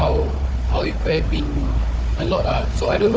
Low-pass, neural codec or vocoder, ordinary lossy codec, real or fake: none; codec, 16 kHz, 4 kbps, FunCodec, trained on Chinese and English, 50 frames a second; none; fake